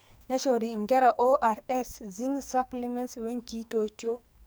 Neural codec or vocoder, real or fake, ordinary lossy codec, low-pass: codec, 44.1 kHz, 2.6 kbps, SNAC; fake; none; none